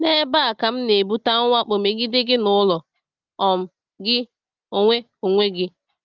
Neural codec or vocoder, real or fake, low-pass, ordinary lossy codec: none; real; 7.2 kHz; Opus, 24 kbps